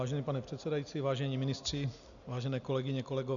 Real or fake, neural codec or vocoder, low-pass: real; none; 7.2 kHz